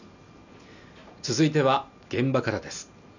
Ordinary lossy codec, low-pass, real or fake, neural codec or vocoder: none; 7.2 kHz; real; none